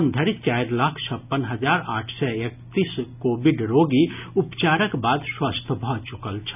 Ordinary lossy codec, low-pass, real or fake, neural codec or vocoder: none; 3.6 kHz; real; none